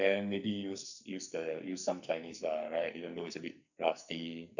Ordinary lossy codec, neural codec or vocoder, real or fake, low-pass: none; codec, 44.1 kHz, 2.6 kbps, SNAC; fake; 7.2 kHz